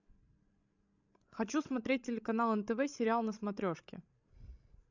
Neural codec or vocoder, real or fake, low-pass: codec, 16 kHz, 16 kbps, FreqCodec, larger model; fake; 7.2 kHz